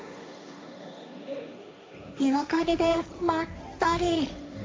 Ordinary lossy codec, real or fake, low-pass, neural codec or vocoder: none; fake; none; codec, 16 kHz, 1.1 kbps, Voila-Tokenizer